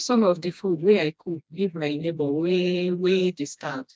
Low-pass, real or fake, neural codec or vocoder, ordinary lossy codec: none; fake; codec, 16 kHz, 1 kbps, FreqCodec, smaller model; none